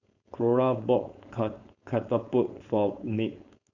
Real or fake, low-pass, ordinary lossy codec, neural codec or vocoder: fake; 7.2 kHz; none; codec, 16 kHz, 4.8 kbps, FACodec